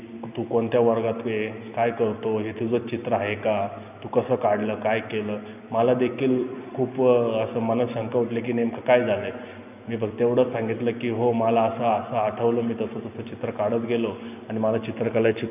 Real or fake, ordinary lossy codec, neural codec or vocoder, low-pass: real; none; none; 3.6 kHz